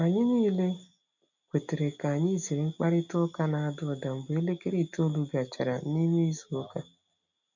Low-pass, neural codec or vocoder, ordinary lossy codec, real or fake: 7.2 kHz; none; none; real